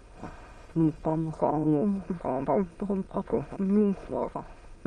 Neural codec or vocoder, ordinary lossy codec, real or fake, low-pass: autoencoder, 22.05 kHz, a latent of 192 numbers a frame, VITS, trained on many speakers; Opus, 24 kbps; fake; 9.9 kHz